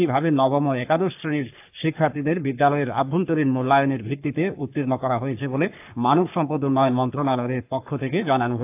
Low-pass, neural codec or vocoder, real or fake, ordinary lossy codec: 3.6 kHz; codec, 44.1 kHz, 3.4 kbps, Pupu-Codec; fake; AAC, 32 kbps